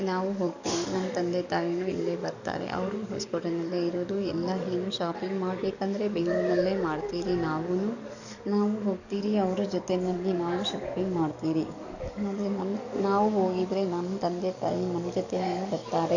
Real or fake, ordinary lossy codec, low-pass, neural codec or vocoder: fake; none; 7.2 kHz; codec, 44.1 kHz, 7.8 kbps, DAC